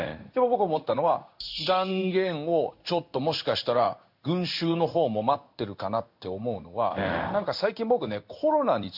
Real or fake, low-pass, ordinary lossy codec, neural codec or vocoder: fake; 5.4 kHz; none; codec, 16 kHz in and 24 kHz out, 1 kbps, XY-Tokenizer